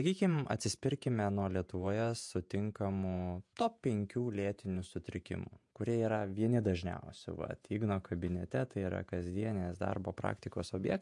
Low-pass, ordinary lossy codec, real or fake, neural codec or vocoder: 10.8 kHz; MP3, 64 kbps; fake; vocoder, 48 kHz, 128 mel bands, Vocos